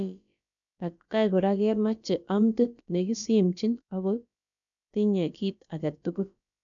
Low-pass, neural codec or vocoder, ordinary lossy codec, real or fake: 7.2 kHz; codec, 16 kHz, about 1 kbps, DyCAST, with the encoder's durations; none; fake